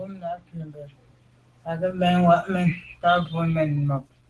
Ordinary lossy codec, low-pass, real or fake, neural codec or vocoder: Opus, 16 kbps; 10.8 kHz; real; none